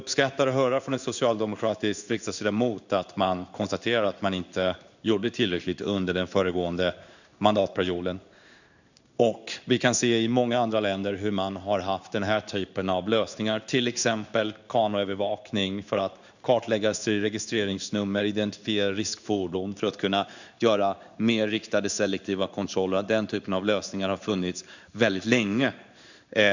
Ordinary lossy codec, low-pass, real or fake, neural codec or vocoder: none; 7.2 kHz; fake; codec, 16 kHz in and 24 kHz out, 1 kbps, XY-Tokenizer